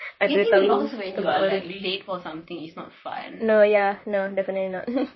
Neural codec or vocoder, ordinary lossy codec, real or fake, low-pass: vocoder, 44.1 kHz, 128 mel bands, Pupu-Vocoder; MP3, 24 kbps; fake; 7.2 kHz